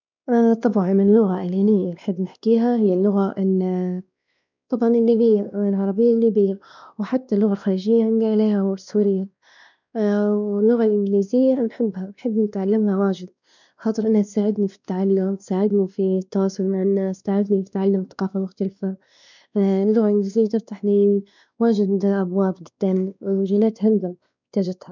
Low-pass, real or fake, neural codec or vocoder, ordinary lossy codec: 7.2 kHz; fake; codec, 16 kHz, 2 kbps, X-Codec, WavLM features, trained on Multilingual LibriSpeech; none